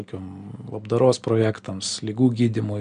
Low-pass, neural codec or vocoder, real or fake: 9.9 kHz; none; real